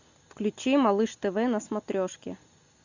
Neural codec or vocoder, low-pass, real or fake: none; 7.2 kHz; real